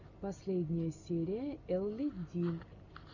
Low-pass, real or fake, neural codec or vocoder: 7.2 kHz; real; none